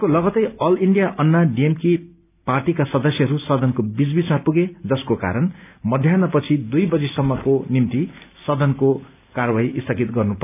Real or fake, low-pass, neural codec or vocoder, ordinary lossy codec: real; 3.6 kHz; none; none